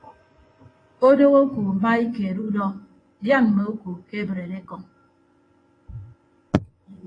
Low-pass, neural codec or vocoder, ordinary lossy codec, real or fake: 9.9 kHz; vocoder, 24 kHz, 100 mel bands, Vocos; AAC, 32 kbps; fake